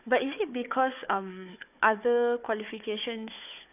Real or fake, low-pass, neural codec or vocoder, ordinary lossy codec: fake; 3.6 kHz; codec, 16 kHz, 4 kbps, FunCodec, trained on Chinese and English, 50 frames a second; none